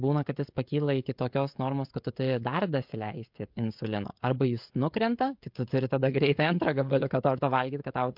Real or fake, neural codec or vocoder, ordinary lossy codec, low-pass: fake; codec, 16 kHz, 16 kbps, FreqCodec, smaller model; MP3, 48 kbps; 5.4 kHz